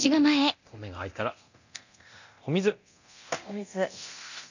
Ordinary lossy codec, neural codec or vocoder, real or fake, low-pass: none; codec, 24 kHz, 0.9 kbps, DualCodec; fake; 7.2 kHz